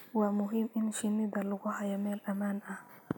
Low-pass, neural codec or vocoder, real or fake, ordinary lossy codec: none; none; real; none